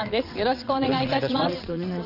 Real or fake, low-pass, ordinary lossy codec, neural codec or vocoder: fake; 5.4 kHz; Opus, 64 kbps; vocoder, 22.05 kHz, 80 mel bands, WaveNeXt